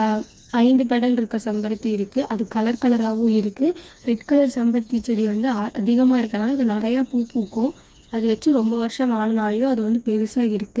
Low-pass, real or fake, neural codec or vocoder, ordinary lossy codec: none; fake; codec, 16 kHz, 2 kbps, FreqCodec, smaller model; none